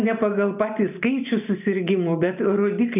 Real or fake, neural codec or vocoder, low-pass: real; none; 3.6 kHz